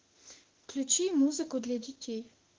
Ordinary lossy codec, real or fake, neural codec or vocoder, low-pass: Opus, 16 kbps; fake; autoencoder, 48 kHz, 32 numbers a frame, DAC-VAE, trained on Japanese speech; 7.2 kHz